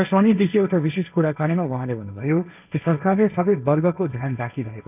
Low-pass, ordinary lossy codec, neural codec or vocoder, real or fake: 3.6 kHz; none; codec, 16 kHz, 1.1 kbps, Voila-Tokenizer; fake